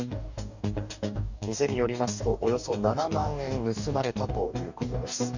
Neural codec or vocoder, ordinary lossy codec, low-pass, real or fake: codec, 44.1 kHz, 2.6 kbps, DAC; none; 7.2 kHz; fake